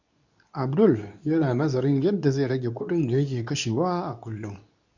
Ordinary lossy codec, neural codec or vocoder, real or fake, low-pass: none; codec, 24 kHz, 0.9 kbps, WavTokenizer, medium speech release version 2; fake; 7.2 kHz